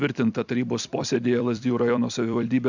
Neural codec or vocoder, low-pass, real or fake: vocoder, 44.1 kHz, 128 mel bands every 256 samples, BigVGAN v2; 7.2 kHz; fake